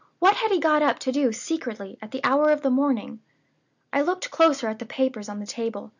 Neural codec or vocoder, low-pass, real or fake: none; 7.2 kHz; real